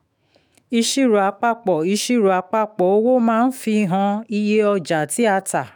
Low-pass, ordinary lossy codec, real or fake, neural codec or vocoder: none; none; fake; autoencoder, 48 kHz, 128 numbers a frame, DAC-VAE, trained on Japanese speech